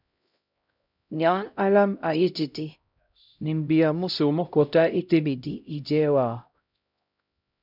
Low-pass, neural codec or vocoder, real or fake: 5.4 kHz; codec, 16 kHz, 0.5 kbps, X-Codec, HuBERT features, trained on LibriSpeech; fake